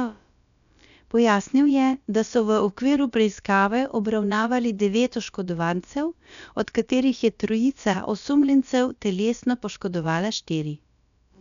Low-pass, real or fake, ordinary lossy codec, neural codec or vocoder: 7.2 kHz; fake; none; codec, 16 kHz, about 1 kbps, DyCAST, with the encoder's durations